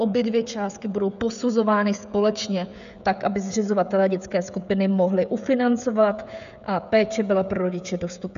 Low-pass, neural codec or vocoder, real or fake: 7.2 kHz; codec, 16 kHz, 16 kbps, FreqCodec, smaller model; fake